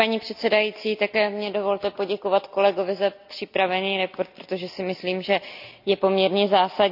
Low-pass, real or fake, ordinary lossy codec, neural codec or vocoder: 5.4 kHz; real; none; none